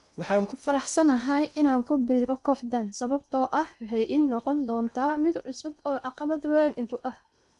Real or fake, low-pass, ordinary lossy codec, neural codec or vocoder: fake; 10.8 kHz; none; codec, 16 kHz in and 24 kHz out, 0.8 kbps, FocalCodec, streaming, 65536 codes